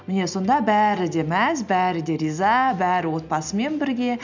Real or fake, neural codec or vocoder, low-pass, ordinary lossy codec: real; none; 7.2 kHz; none